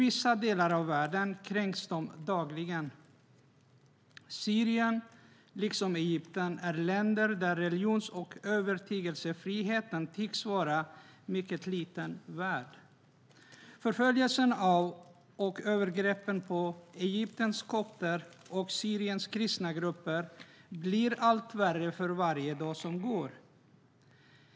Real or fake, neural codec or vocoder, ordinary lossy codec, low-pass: real; none; none; none